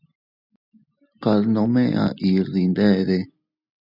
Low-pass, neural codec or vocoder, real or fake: 5.4 kHz; none; real